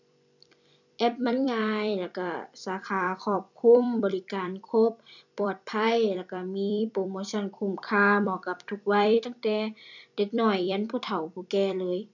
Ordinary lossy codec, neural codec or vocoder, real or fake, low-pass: none; vocoder, 44.1 kHz, 128 mel bands every 256 samples, BigVGAN v2; fake; 7.2 kHz